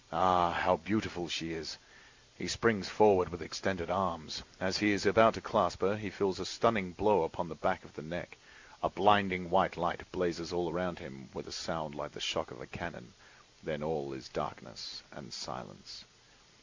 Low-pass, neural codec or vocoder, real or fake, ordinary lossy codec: 7.2 kHz; none; real; MP3, 64 kbps